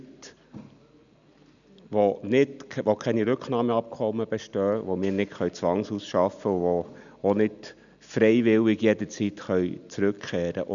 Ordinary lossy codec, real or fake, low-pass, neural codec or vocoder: none; real; 7.2 kHz; none